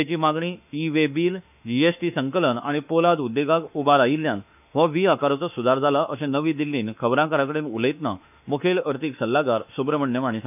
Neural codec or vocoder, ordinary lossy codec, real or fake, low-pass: autoencoder, 48 kHz, 32 numbers a frame, DAC-VAE, trained on Japanese speech; none; fake; 3.6 kHz